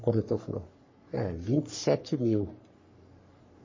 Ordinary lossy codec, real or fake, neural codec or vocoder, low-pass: MP3, 32 kbps; fake; codec, 44.1 kHz, 3.4 kbps, Pupu-Codec; 7.2 kHz